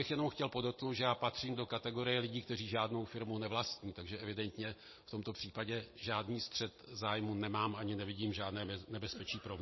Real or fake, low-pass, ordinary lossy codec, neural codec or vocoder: real; 7.2 kHz; MP3, 24 kbps; none